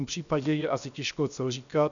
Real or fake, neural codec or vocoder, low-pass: fake; codec, 16 kHz, 0.7 kbps, FocalCodec; 7.2 kHz